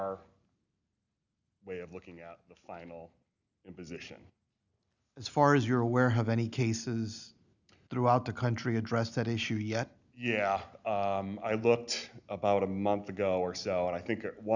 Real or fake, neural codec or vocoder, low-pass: real; none; 7.2 kHz